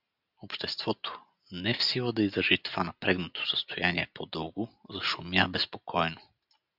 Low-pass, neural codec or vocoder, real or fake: 5.4 kHz; none; real